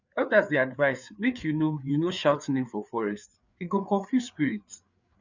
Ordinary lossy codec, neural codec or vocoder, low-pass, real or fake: none; codec, 16 kHz, 4 kbps, FreqCodec, larger model; 7.2 kHz; fake